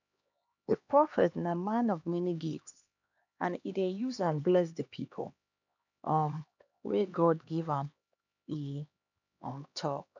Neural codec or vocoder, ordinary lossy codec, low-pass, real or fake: codec, 16 kHz, 2 kbps, X-Codec, HuBERT features, trained on LibriSpeech; none; 7.2 kHz; fake